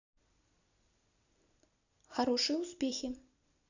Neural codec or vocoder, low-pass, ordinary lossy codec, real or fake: none; 7.2 kHz; none; real